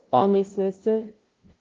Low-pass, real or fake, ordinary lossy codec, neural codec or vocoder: 7.2 kHz; fake; Opus, 16 kbps; codec, 16 kHz, 0.5 kbps, FunCodec, trained on LibriTTS, 25 frames a second